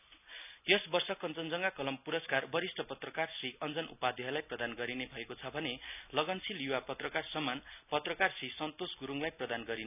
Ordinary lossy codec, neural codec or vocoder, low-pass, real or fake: none; none; 3.6 kHz; real